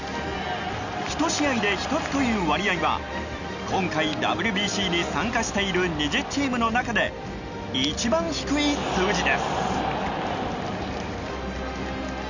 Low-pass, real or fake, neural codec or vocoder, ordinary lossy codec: 7.2 kHz; real; none; none